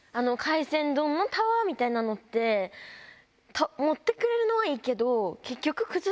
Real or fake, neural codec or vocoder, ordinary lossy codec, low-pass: real; none; none; none